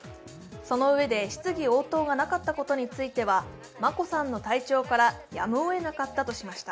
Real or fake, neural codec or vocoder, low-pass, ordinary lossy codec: real; none; none; none